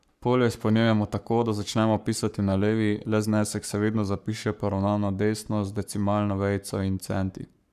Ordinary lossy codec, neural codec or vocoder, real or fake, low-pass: none; codec, 44.1 kHz, 7.8 kbps, Pupu-Codec; fake; 14.4 kHz